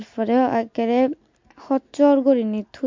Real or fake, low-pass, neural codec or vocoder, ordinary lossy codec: real; 7.2 kHz; none; MP3, 48 kbps